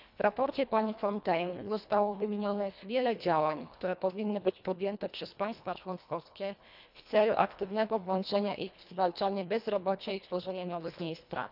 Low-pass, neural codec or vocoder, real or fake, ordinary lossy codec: 5.4 kHz; codec, 24 kHz, 1.5 kbps, HILCodec; fake; none